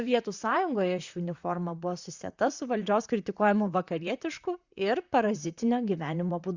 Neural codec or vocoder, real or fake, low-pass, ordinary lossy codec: vocoder, 44.1 kHz, 128 mel bands, Pupu-Vocoder; fake; 7.2 kHz; Opus, 64 kbps